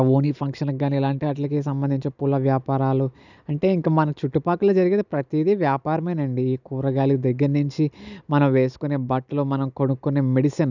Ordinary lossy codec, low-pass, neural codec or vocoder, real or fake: none; 7.2 kHz; none; real